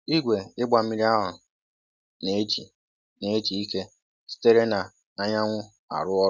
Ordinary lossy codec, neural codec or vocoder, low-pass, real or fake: none; none; none; real